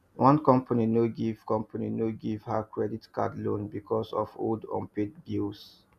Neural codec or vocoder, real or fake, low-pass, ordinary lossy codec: none; real; 14.4 kHz; none